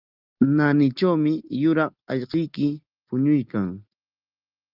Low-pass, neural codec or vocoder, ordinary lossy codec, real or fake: 5.4 kHz; none; Opus, 32 kbps; real